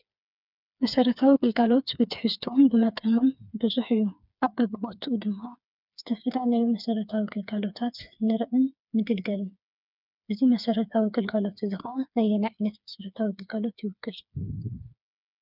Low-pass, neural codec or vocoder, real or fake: 5.4 kHz; codec, 16 kHz, 4 kbps, FreqCodec, smaller model; fake